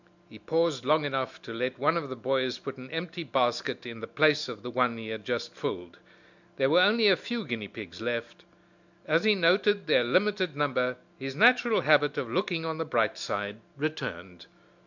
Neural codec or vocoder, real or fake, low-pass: none; real; 7.2 kHz